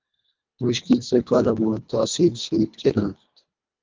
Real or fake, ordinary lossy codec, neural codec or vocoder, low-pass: fake; Opus, 24 kbps; codec, 24 kHz, 1.5 kbps, HILCodec; 7.2 kHz